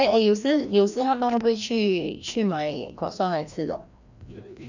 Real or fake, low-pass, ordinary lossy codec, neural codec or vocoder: fake; 7.2 kHz; none; codec, 16 kHz, 1 kbps, FreqCodec, larger model